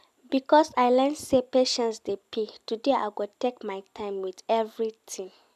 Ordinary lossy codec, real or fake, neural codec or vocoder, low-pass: none; real; none; 14.4 kHz